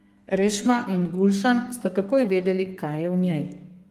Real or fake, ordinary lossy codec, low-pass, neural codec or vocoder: fake; Opus, 32 kbps; 14.4 kHz; codec, 32 kHz, 1.9 kbps, SNAC